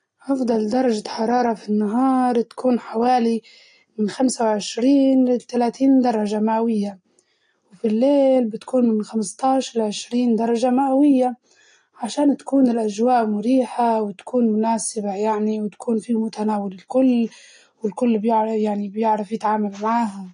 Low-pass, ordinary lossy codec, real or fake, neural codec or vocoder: 9.9 kHz; AAC, 48 kbps; real; none